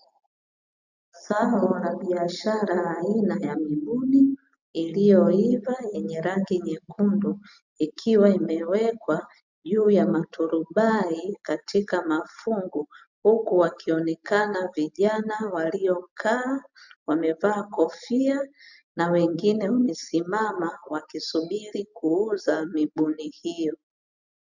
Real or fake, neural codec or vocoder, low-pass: real; none; 7.2 kHz